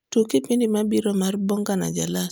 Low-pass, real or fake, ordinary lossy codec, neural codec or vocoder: none; real; none; none